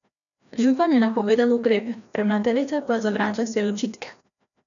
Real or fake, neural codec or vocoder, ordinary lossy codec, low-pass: fake; codec, 16 kHz, 1 kbps, FreqCodec, larger model; none; 7.2 kHz